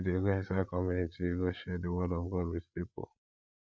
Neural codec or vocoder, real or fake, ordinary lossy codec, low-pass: codec, 16 kHz, 8 kbps, FreqCodec, larger model; fake; none; none